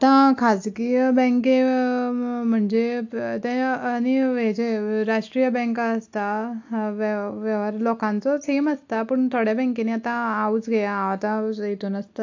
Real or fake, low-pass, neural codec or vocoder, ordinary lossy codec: real; 7.2 kHz; none; AAC, 48 kbps